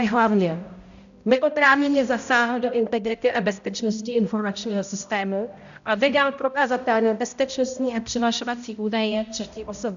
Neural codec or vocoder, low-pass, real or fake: codec, 16 kHz, 0.5 kbps, X-Codec, HuBERT features, trained on balanced general audio; 7.2 kHz; fake